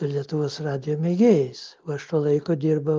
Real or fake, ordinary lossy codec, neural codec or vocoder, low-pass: real; Opus, 32 kbps; none; 7.2 kHz